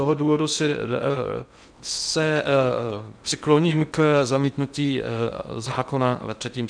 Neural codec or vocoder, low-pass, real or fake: codec, 16 kHz in and 24 kHz out, 0.6 kbps, FocalCodec, streaming, 2048 codes; 9.9 kHz; fake